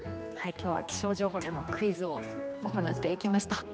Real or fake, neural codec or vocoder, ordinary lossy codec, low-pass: fake; codec, 16 kHz, 2 kbps, X-Codec, HuBERT features, trained on general audio; none; none